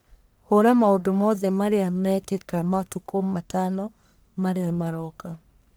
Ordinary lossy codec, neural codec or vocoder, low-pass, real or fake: none; codec, 44.1 kHz, 1.7 kbps, Pupu-Codec; none; fake